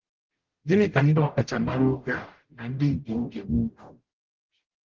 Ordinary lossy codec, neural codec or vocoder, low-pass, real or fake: Opus, 16 kbps; codec, 44.1 kHz, 0.9 kbps, DAC; 7.2 kHz; fake